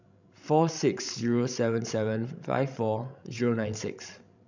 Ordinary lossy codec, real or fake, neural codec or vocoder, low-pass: none; fake; codec, 16 kHz, 16 kbps, FreqCodec, larger model; 7.2 kHz